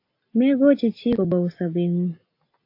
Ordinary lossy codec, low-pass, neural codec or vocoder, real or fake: AAC, 32 kbps; 5.4 kHz; none; real